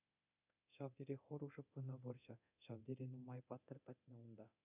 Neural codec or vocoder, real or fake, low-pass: codec, 24 kHz, 0.9 kbps, DualCodec; fake; 3.6 kHz